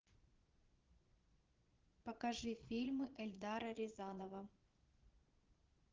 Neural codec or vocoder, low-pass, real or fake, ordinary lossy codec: vocoder, 22.05 kHz, 80 mel bands, Vocos; 7.2 kHz; fake; Opus, 16 kbps